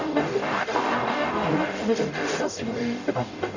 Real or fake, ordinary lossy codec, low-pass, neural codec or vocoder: fake; none; 7.2 kHz; codec, 44.1 kHz, 0.9 kbps, DAC